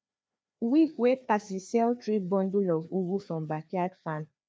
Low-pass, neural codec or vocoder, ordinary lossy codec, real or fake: none; codec, 16 kHz, 2 kbps, FreqCodec, larger model; none; fake